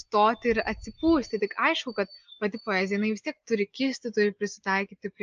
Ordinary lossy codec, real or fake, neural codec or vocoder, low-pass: Opus, 24 kbps; real; none; 7.2 kHz